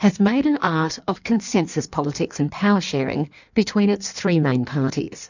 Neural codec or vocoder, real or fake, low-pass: codec, 16 kHz in and 24 kHz out, 1.1 kbps, FireRedTTS-2 codec; fake; 7.2 kHz